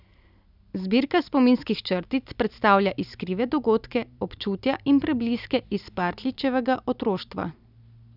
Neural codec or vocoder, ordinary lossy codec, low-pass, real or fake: none; none; 5.4 kHz; real